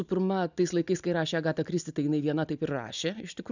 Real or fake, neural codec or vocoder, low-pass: real; none; 7.2 kHz